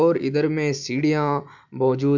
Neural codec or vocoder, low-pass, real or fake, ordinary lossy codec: none; 7.2 kHz; real; none